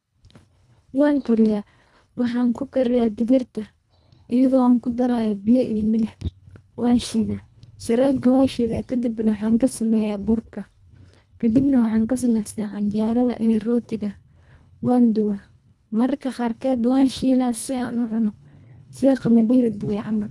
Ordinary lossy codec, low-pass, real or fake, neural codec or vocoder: none; none; fake; codec, 24 kHz, 1.5 kbps, HILCodec